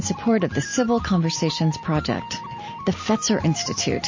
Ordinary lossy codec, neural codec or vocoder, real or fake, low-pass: MP3, 32 kbps; none; real; 7.2 kHz